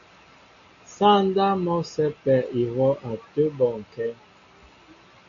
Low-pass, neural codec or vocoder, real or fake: 7.2 kHz; none; real